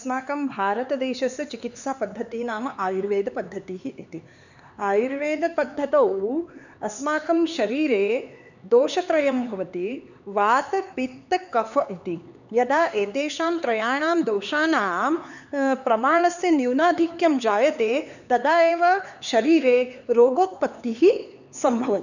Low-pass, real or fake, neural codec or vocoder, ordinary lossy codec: 7.2 kHz; fake; codec, 16 kHz, 4 kbps, X-Codec, HuBERT features, trained on LibriSpeech; none